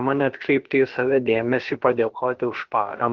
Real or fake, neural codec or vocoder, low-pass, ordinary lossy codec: fake; codec, 16 kHz, about 1 kbps, DyCAST, with the encoder's durations; 7.2 kHz; Opus, 16 kbps